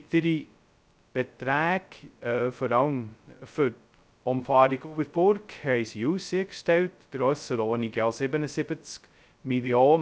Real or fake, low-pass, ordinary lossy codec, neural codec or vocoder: fake; none; none; codec, 16 kHz, 0.2 kbps, FocalCodec